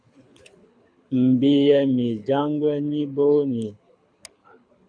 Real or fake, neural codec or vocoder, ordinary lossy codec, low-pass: fake; codec, 24 kHz, 6 kbps, HILCodec; AAC, 64 kbps; 9.9 kHz